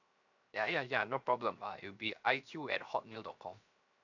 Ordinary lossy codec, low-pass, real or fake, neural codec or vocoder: none; 7.2 kHz; fake; codec, 16 kHz, 0.7 kbps, FocalCodec